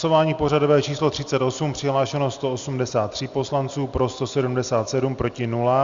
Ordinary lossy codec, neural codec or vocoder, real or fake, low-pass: Opus, 64 kbps; none; real; 7.2 kHz